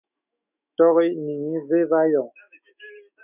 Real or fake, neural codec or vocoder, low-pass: fake; autoencoder, 48 kHz, 128 numbers a frame, DAC-VAE, trained on Japanese speech; 3.6 kHz